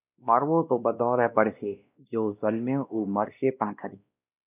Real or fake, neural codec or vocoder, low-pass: fake; codec, 16 kHz, 1 kbps, X-Codec, WavLM features, trained on Multilingual LibriSpeech; 3.6 kHz